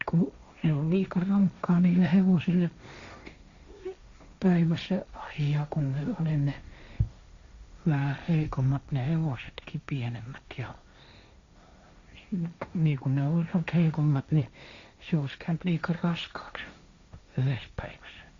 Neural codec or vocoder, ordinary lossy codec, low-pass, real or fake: codec, 16 kHz, 1.1 kbps, Voila-Tokenizer; Opus, 64 kbps; 7.2 kHz; fake